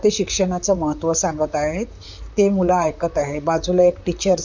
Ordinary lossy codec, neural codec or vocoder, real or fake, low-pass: none; vocoder, 44.1 kHz, 128 mel bands, Pupu-Vocoder; fake; 7.2 kHz